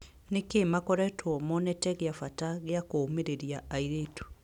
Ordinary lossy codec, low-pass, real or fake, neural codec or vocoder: none; 19.8 kHz; real; none